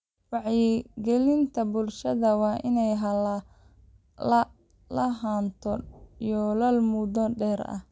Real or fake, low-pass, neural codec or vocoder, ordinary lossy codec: real; none; none; none